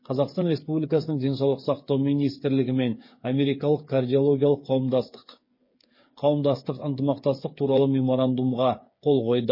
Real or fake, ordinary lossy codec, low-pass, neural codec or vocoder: fake; MP3, 24 kbps; 5.4 kHz; codec, 16 kHz, 8 kbps, FreqCodec, smaller model